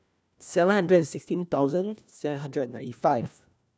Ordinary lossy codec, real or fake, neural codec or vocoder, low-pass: none; fake; codec, 16 kHz, 1 kbps, FunCodec, trained on LibriTTS, 50 frames a second; none